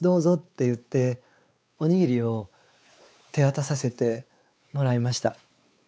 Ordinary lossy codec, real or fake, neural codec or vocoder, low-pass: none; fake; codec, 16 kHz, 4 kbps, X-Codec, WavLM features, trained on Multilingual LibriSpeech; none